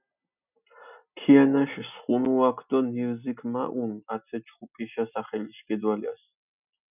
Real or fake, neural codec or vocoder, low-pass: real; none; 3.6 kHz